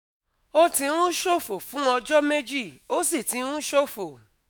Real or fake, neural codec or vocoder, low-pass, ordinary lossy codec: fake; autoencoder, 48 kHz, 128 numbers a frame, DAC-VAE, trained on Japanese speech; none; none